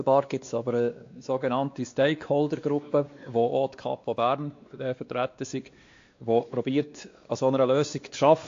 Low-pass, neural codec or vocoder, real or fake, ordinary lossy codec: 7.2 kHz; codec, 16 kHz, 2 kbps, X-Codec, WavLM features, trained on Multilingual LibriSpeech; fake; AAC, 48 kbps